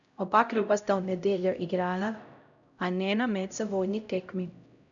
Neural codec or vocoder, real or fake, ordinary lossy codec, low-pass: codec, 16 kHz, 0.5 kbps, X-Codec, HuBERT features, trained on LibriSpeech; fake; none; 7.2 kHz